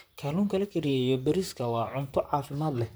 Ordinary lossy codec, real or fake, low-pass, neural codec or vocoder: none; fake; none; vocoder, 44.1 kHz, 128 mel bands, Pupu-Vocoder